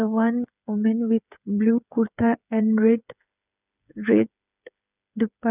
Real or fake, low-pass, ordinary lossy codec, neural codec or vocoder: fake; 3.6 kHz; none; codec, 16 kHz, 8 kbps, FreqCodec, smaller model